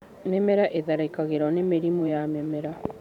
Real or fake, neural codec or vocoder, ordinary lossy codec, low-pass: fake; vocoder, 44.1 kHz, 128 mel bands every 512 samples, BigVGAN v2; none; 19.8 kHz